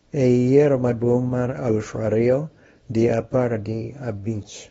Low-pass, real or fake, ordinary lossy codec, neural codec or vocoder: 10.8 kHz; fake; AAC, 24 kbps; codec, 24 kHz, 0.9 kbps, WavTokenizer, small release